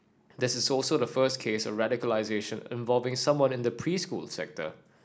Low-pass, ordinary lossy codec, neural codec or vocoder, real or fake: none; none; none; real